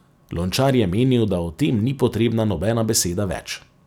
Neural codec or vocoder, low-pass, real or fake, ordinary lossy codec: none; 19.8 kHz; real; none